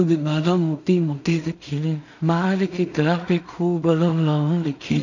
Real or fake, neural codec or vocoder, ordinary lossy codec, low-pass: fake; codec, 16 kHz in and 24 kHz out, 0.4 kbps, LongCat-Audio-Codec, two codebook decoder; none; 7.2 kHz